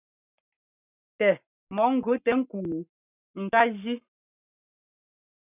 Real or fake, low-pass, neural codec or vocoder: fake; 3.6 kHz; codec, 44.1 kHz, 7.8 kbps, Pupu-Codec